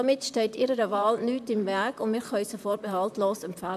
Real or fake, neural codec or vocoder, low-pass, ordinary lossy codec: fake; vocoder, 44.1 kHz, 128 mel bands, Pupu-Vocoder; 14.4 kHz; MP3, 96 kbps